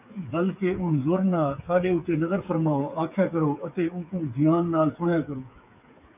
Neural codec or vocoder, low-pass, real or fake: codec, 16 kHz, 8 kbps, FreqCodec, smaller model; 3.6 kHz; fake